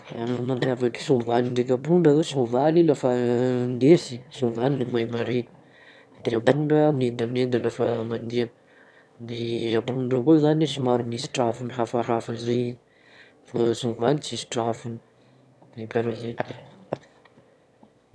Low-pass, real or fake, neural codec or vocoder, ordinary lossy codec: none; fake; autoencoder, 22.05 kHz, a latent of 192 numbers a frame, VITS, trained on one speaker; none